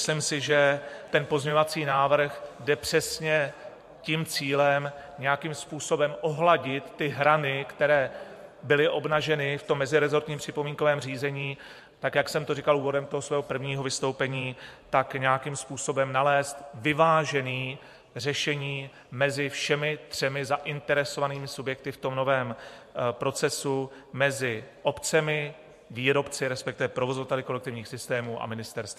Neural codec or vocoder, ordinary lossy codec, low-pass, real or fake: vocoder, 44.1 kHz, 128 mel bands every 512 samples, BigVGAN v2; MP3, 64 kbps; 14.4 kHz; fake